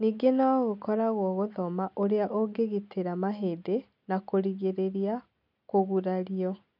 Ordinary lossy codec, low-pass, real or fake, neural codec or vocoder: none; 5.4 kHz; real; none